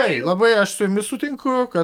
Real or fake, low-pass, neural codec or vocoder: fake; 19.8 kHz; codec, 44.1 kHz, 7.8 kbps, DAC